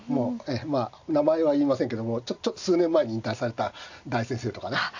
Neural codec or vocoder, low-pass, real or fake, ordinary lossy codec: none; 7.2 kHz; real; AAC, 48 kbps